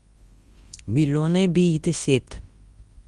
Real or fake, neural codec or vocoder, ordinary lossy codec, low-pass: fake; codec, 24 kHz, 0.9 kbps, WavTokenizer, large speech release; Opus, 24 kbps; 10.8 kHz